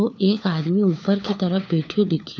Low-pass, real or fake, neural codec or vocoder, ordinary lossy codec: none; fake; codec, 16 kHz, 4 kbps, FunCodec, trained on LibriTTS, 50 frames a second; none